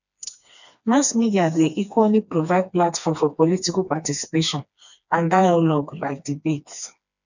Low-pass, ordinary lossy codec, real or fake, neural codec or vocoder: 7.2 kHz; none; fake; codec, 16 kHz, 2 kbps, FreqCodec, smaller model